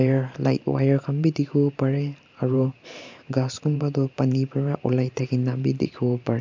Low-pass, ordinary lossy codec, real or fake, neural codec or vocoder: 7.2 kHz; MP3, 64 kbps; fake; vocoder, 44.1 kHz, 128 mel bands every 512 samples, BigVGAN v2